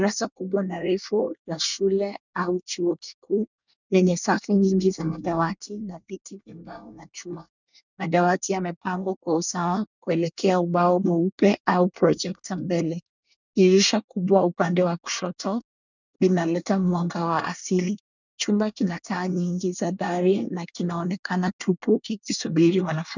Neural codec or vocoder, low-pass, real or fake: codec, 24 kHz, 1 kbps, SNAC; 7.2 kHz; fake